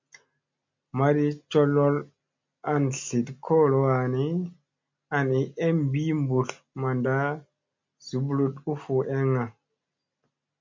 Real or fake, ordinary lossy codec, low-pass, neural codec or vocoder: real; MP3, 64 kbps; 7.2 kHz; none